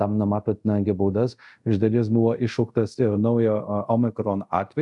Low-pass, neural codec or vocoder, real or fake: 10.8 kHz; codec, 24 kHz, 0.5 kbps, DualCodec; fake